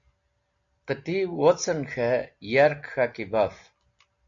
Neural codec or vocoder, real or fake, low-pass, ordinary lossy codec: none; real; 7.2 kHz; AAC, 64 kbps